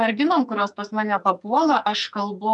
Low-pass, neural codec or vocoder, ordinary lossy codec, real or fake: 10.8 kHz; codec, 44.1 kHz, 2.6 kbps, SNAC; MP3, 96 kbps; fake